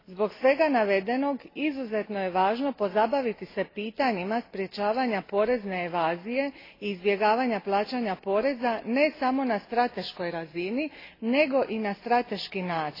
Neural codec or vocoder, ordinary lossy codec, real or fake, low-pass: none; AAC, 24 kbps; real; 5.4 kHz